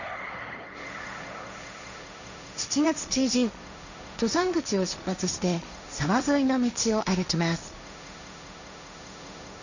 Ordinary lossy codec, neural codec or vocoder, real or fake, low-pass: none; codec, 16 kHz, 1.1 kbps, Voila-Tokenizer; fake; 7.2 kHz